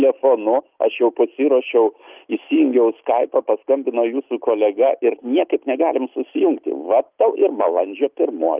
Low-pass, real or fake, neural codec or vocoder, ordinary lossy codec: 3.6 kHz; real; none; Opus, 24 kbps